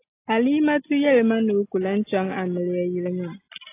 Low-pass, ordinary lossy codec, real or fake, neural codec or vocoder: 3.6 kHz; AAC, 24 kbps; real; none